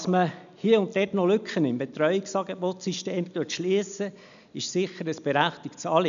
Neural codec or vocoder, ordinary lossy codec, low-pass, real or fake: none; none; 7.2 kHz; real